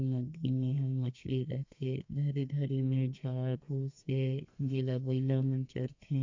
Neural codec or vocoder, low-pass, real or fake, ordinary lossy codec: codec, 32 kHz, 1.9 kbps, SNAC; 7.2 kHz; fake; MP3, 48 kbps